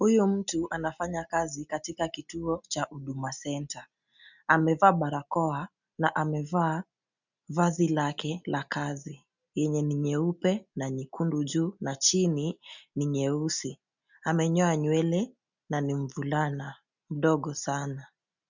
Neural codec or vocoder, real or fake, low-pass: none; real; 7.2 kHz